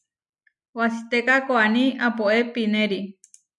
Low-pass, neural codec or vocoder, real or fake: 10.8 kHz; none; real